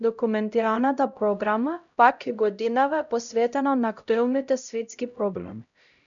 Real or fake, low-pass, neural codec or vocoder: fake; 7.2 kHz; codec, 16 kHz, 0.5 kbps, X-Codec, HuBERT features, trained on LibriSpeech